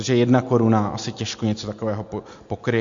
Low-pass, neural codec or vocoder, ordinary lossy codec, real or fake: 7.2 kHz; none; MP3, 48 kbps; real